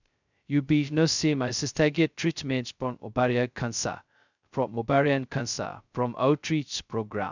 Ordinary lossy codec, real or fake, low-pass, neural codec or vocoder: none; fake; 7.2 kHz; codec, 16 kHz, 0.2 kbps, FocalCodec